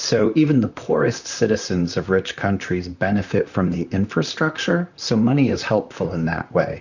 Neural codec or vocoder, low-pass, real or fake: vocoder, 44.1 kHz, 128 mel bands, Pupu-Vocoder; 7.2 kHz; fake